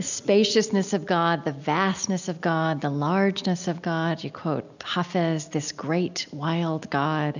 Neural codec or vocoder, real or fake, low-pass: none; real; 7.2 kHz